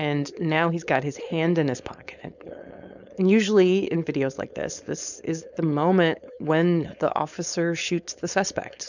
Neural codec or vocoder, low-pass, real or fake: codec, 16 kHz, 4.8 kbps, FACodec; 7.2 kHz; fake